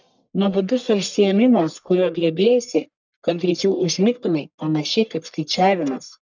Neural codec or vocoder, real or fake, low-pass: codec, 44.1 kHz, 1.7 kbps, Pupu-Codec; fake; 7.2 kHz